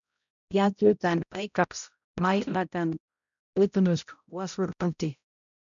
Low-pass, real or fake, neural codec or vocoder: 7.2 kHz; fake; codec, 16 kHz, 0.5 kbps, X-Codec, HuBERT features, trained on balanced general audio